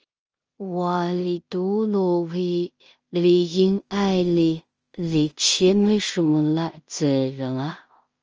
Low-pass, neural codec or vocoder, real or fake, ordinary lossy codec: 7.2 kHz; codec, 16 kHz in and 24 kHz out, 0.4 kbps, LongCat-Audio-Codec, two codebook decoder; fake; Opus, 24 kbps